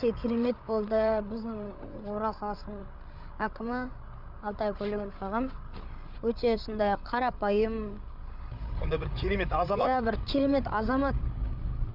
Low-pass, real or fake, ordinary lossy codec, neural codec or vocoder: 5.4 kHz; fake; none; codec, 16 kHz, 8 kbps, FreqCodec, larger model